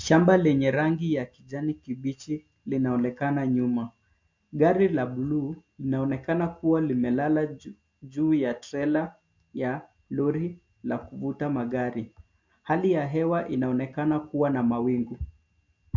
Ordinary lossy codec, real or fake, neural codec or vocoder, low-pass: MP3, 48 kbps; real; none; 7.2 kHz